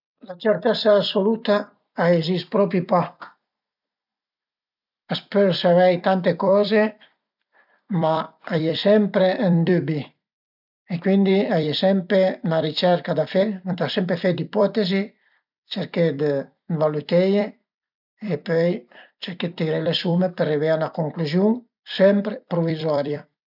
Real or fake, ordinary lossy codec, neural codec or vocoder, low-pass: fake; none; vocoder, 44.1 kHz, 128 mel bands every 256 samples, BigVGAN v2; 5.4 kHz